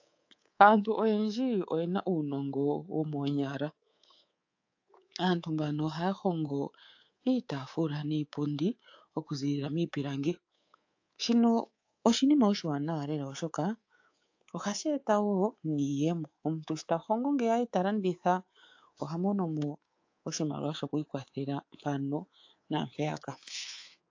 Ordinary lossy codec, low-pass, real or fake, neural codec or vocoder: AAC, 48 kbps; 7.2 kHz; fake; codec, 24 kHz, 3.1 kbps, DualCodec